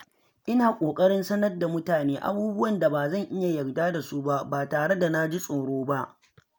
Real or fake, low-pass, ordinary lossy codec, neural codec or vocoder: real; none; none; none